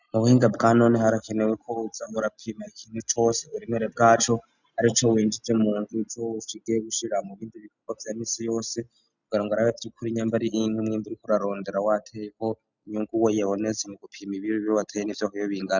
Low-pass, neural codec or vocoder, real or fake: 7.2 kHz; none; real